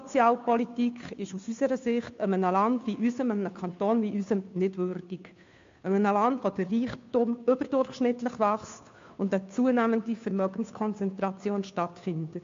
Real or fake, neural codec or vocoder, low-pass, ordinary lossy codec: fake; codec, 16 kHz, 2 kbps, FunCodec, trained on Chinese and English, 25 frames a second; 7.2 kHz; MP3, 48 kbps